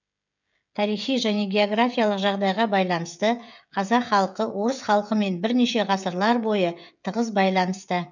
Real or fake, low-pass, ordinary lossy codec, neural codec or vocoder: fake; 7.2 kHz; none; codec, 16 kHz, 16 kbps, FreqCodec, smaller model